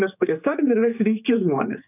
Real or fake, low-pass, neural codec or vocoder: fake; 3.6 kHz; codec, 16 kHz, 4 kbps, X-Codec, HuBERT features, trained on general audio